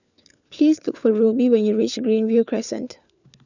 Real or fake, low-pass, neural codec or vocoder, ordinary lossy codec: fake; 7.2 kHz; codec, 16 kHz, 4 kbps, FunCodec, trained on LibriTTS, 50 frames a second; none